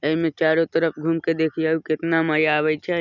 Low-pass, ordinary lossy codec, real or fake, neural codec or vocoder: 7.2 kHz; none; real; none